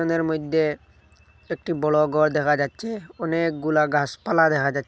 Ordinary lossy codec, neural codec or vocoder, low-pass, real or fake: none; none; none; real